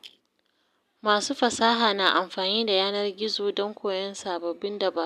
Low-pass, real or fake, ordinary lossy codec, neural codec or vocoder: 14.4 kHz; real; none; none